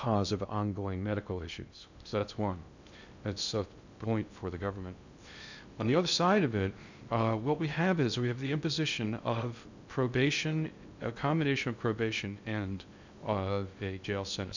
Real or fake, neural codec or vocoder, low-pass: fake; codec, 16 kHz in and 24 kHz out, 0.6 kbps, FocalCodec, streaming, 2048 codes; 7.2 kHz